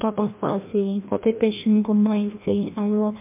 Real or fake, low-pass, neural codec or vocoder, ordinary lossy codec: fake; 3.6 kHz; codec, 16 kHz, 1 kbps, FreqCodec, larger model; MP3, 32 kbps